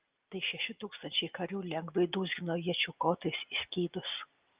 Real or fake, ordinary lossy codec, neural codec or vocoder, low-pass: real; Opus, 24 kbps; none; 3.6 kHz